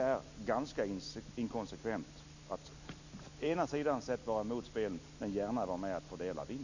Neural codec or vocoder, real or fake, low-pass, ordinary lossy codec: none; real; 7.2 kHz; none